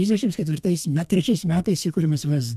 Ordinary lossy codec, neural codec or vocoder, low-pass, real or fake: MP3, 96 kbps; codec, 32 kHz, 1.9 kbps, SNAC; 14.4 kHz; fake